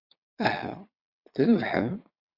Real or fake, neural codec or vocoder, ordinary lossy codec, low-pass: fake; vocoder, 22.05 kHz, 80 mel bands, Vocos; Opus, 64 kbps; 5.4 kHz